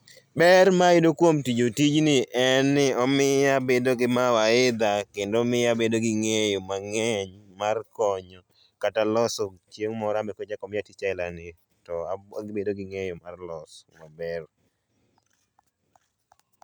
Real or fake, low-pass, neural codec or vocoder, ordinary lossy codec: real; none; none; none